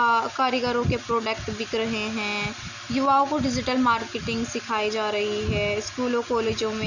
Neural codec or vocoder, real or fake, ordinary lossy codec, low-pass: none; real; none; 7.2 kHz